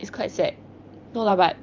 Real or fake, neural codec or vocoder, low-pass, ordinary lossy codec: fake; vocoder, 44.1 kHz, 128 mel bands every 512 samples, BigVGAN v2; 7.2 kHz; Opus, 24 kbps